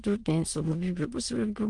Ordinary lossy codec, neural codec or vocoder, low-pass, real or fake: Opus, 24 kbps; autoencoder, 22.05 kHz, a latent of 192 numbers a frame, VITS, trained on many speakers; 9.9 kHz; fake